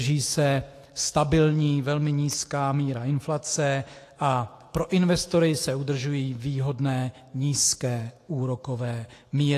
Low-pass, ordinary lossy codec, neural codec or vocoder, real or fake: 14.4 kHz; AAC, 48 kbps; autoencoder, 48 kHz, 128 numbers a frame, DAC-VAE, trained on Japanese speech; fake